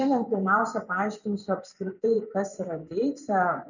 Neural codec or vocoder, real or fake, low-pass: vocoder, 24 kHz, 100 mel bands, Vocos; fake; 7.2 kHz